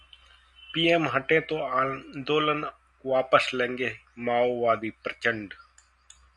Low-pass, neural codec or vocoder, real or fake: 10.8 kHz; none; real